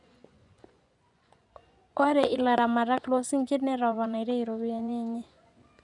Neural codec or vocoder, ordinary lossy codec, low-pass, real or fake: vocoder, 24 kHz, 100 mel bands, Vocos; none; 10.8 kHz; fake